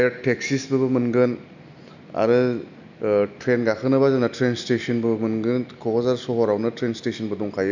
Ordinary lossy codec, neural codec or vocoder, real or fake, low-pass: none; none; real; 7.2 kHz